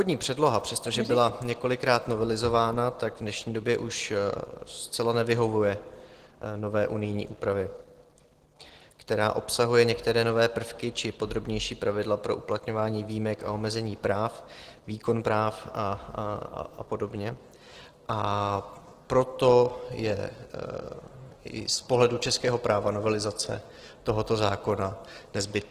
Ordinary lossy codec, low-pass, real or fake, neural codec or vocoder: Opus, 16 kbps; 14.4 kHz; real; none